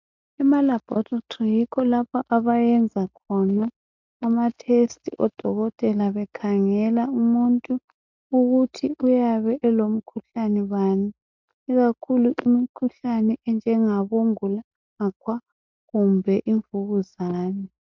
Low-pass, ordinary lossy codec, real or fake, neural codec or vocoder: 7.2 kHz; MP3, 64 kbps; real; none